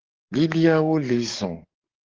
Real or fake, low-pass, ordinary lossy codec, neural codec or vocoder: fake; 7.2 kHz; Opus, 16 kbps; codec, 44.1 kHz, 7.8 kbps, DAC